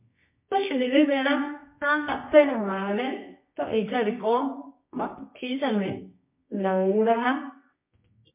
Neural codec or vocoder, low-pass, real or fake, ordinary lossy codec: codec, 24 kHz, 0.9 kbps, WavTokenizer, medium music audio release; 3.6 kHz; fake; MP3, 24 kbps